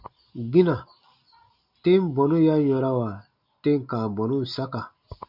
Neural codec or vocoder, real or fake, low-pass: none; real; 5.4 kHz